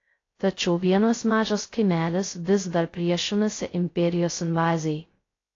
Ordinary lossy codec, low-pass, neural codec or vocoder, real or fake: AAC, 32 kbps; 7.2 kHz; codec, 16 kHz, 0.2 kbps, FocalCodec; fake